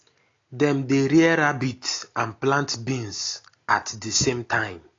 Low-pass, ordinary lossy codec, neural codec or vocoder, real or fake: 7.2 kHz; AAC, 32 kbps; none; real